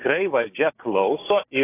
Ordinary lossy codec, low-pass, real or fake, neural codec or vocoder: AAC, 16 kbps; 3.6 kHz; fake; codec, 16 kHz, 6 kbps, DAC